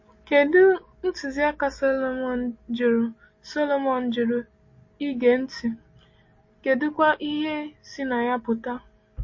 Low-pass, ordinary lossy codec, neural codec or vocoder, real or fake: 7.2 kHz; MP3, 32 kbps; none; real